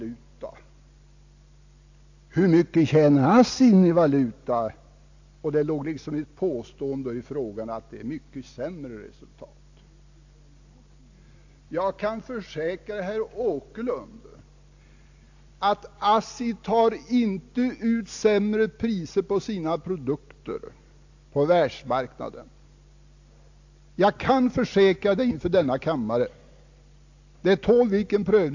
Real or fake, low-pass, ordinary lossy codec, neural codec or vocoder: real; 7.2 kHz; AAC, 48 kbps; none